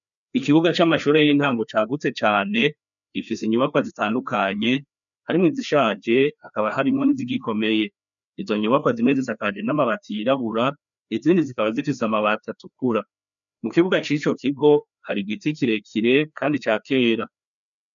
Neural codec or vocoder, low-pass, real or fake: codec, 16 kHz, 2 kbps, FreqCodec, larger model; 7.2 kHz; fake